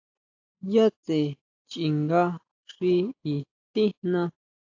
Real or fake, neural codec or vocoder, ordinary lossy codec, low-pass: real; none; AAC, 48 kbps; 7.2 kHz